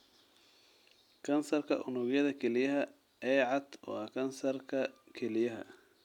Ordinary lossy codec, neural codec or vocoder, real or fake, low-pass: none; none; real; 19.8 kHz